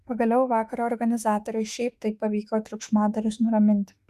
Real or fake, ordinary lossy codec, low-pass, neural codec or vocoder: fake; Opus, 64 kbps; 14.4 kHz; autoencoder, 48 kHz, 32 numbers a frame, DAC-VAE, trained on Japanese speech